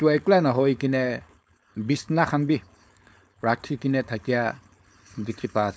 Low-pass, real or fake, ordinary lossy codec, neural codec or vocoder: none; fake; none; codec, 16 kHz, 4.8 kbps, FACodec